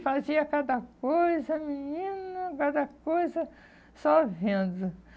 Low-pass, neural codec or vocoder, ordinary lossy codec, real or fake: none; none; none; real